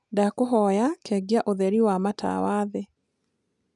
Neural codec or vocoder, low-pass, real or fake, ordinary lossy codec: none; 10.8 kHz; real; none